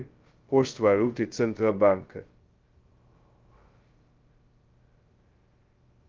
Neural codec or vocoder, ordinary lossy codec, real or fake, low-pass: codec, 16 kHz, 0.2 kbps, FocalCodec; Opus, 32 kbps; fake; 7.2 kHz